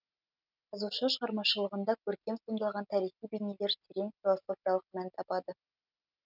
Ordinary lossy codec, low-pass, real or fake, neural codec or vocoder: none; 5.4 kHz; real; none